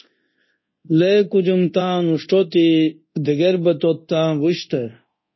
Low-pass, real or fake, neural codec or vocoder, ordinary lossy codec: 7.2 kHz; fake; codec, 24 kHz, 0.9 kbps, DualCodec; MP3, 24 kbps